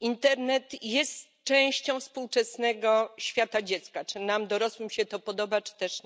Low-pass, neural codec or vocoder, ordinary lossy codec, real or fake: none; none; none; real